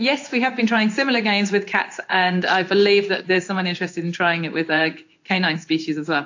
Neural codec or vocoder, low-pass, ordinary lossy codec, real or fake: codec, 16 kHz in and 24 kHz out, 1 kbps, XY-Tokenizer; 7.2 kHz; AAC, 48 kbps; fake